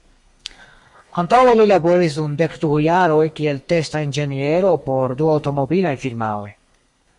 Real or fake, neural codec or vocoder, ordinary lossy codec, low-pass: fake; codec, 32 kHz, 1.9 kbps, SNAC; Opus, 64 kbps; 10.8 kHz